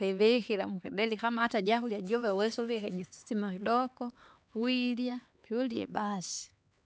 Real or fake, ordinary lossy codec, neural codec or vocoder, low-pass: fake; none; codec, 16 kHz, 4 kbps, X-Codec, HuBERT features, trained on LibriSpeech; none